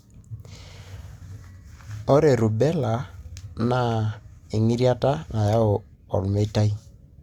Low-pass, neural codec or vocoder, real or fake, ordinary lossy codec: 19.8 kHz; none; real; Opus, 64 kbps